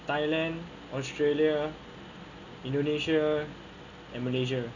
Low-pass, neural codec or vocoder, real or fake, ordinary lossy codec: 7.2 kHz; none; real; none